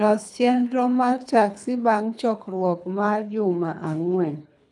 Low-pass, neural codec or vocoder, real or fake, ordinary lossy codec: 10.8 kHz; codec, 24 kHz, 3 kbps, HILCodec; fake; none